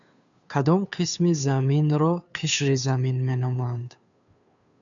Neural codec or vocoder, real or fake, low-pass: codec, 16 kHz, 2 kbps, FunCodec, trained on Chinese and English, 25 frames a second; fake; 7.2 kHz